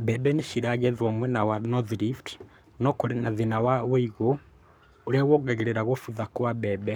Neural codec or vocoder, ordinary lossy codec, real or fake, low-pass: codec, 44.1 kHz, 7.8 kbps, Pupu-Codec; none; fake; none